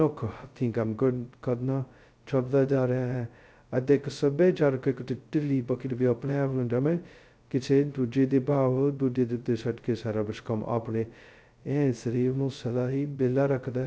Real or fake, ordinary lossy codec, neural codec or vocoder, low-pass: fake; none; codec, 16 kHz, 0.2 kbps, FocalCodec; none